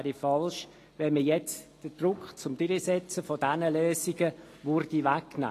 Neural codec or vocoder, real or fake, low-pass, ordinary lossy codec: none; real; 14.4 kHz; AAC, 48 kbps